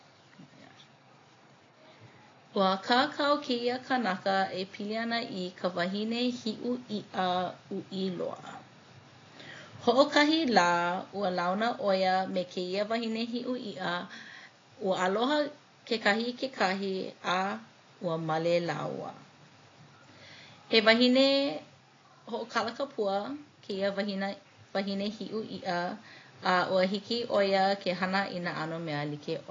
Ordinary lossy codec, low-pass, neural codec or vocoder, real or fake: AAC, 32 kbps; 7.2 kHz; none; real